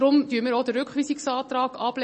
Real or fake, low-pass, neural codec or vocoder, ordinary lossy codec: real; 10.8 kHz; none; MP3, 32 kbps